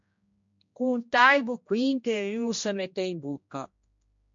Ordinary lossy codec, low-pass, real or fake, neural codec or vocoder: MP3, 48 kbps; 7.2 kHz; fake; codec, 16 kHz, 1 kbps, X-Codec, HuBERT features, trained on general audio